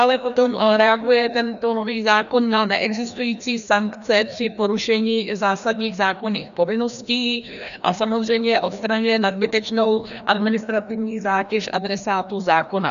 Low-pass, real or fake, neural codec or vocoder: 7.2 kHz; fake; codec, 16 kHz, 1 kbps, FreqCodec, larger model